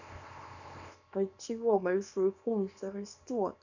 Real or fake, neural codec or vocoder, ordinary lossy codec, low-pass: fake; codec, 24 kHz, 0.9 kbps, WavTokenizer, small release; none; 7.2 kHz